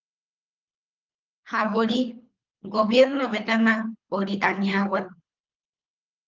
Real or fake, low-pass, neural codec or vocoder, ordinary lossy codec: fake; 7.2 kHz; codec, 24 kHz, 3 kbps, HILCodec; Opus, 32 kbps